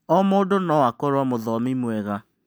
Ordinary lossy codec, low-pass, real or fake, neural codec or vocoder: none; none; real; none